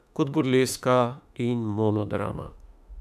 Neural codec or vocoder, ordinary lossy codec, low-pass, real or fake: autoencoder, 48 kHz, 32 numbers a frame, DAC-VAE, trained on Japanese speech; none; 14.4 kHz; fake